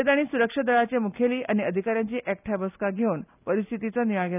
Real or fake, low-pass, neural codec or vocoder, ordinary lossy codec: real; 3.6 kHz; none; none